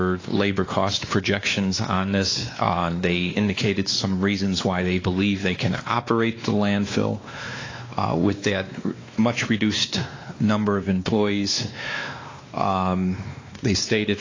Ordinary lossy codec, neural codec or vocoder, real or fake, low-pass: AAC, 32 kbps; codec, 16 kHz, 2 kbps, X-Codec, WavLM features, trained on Multilingual LibriSpeech; fake; 7.2 kHz